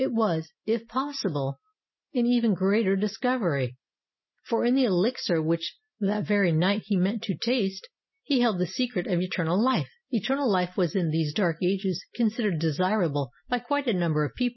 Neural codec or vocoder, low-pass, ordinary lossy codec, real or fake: none; 7.2 kHz; MP3, 24 kbps; real